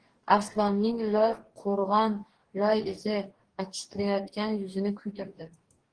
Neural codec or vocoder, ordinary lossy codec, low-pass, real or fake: codec, 44.1 kHz, 2.6 kbps, DAC; Opus, 16 kbps; 9.9 kHz; fake